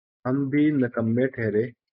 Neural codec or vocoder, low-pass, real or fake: none; 5.4 kHz; real